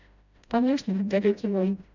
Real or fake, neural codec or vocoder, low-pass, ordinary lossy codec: fake; codec, 16 kHz, 0.5 kbps, FreqCodec, smaller model; 7.2 kHz; none